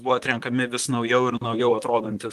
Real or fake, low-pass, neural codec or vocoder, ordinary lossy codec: fake; 14.4 kHz; vocoder, 44.1 kHz, 128 mel bands, Pupu-Vocoder; Opus, 32 kbps